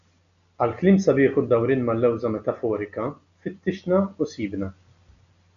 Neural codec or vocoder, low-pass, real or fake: none; 7.2 kHz; real